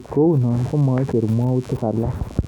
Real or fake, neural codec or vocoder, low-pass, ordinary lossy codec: real; none; 19.8 kHz; none